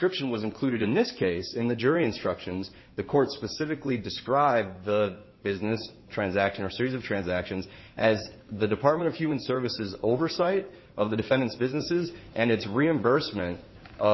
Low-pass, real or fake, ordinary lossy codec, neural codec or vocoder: 7.2 kHz; fake; MP3, 24 kbps; codec, 16 kHz in and 24 kHz out, 2.2 kbps, FireRedTTS-2 codec